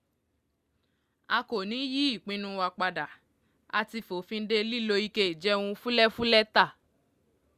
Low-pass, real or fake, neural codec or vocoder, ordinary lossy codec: 14.4 kHz; real; none; Opus, 64 kbps